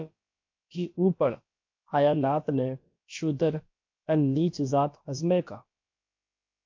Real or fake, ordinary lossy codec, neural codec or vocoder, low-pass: fake; MP3, 48 kbps; codec, 16 kHz, about 1 kbps, DyCAST, with the encoder's durations; 7.2 kHz